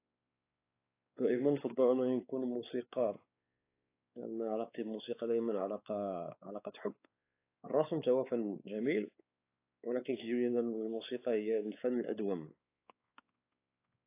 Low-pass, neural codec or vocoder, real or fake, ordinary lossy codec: 3.6 kHz; codec, 16 kHz, 4 kbps, X-Codec, WavLM features, trained on Multilingual LibriSpeech; fake; AAC, 24 kbps